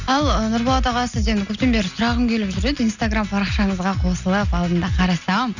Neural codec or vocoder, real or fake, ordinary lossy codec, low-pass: none; real; none; 7.2 kHz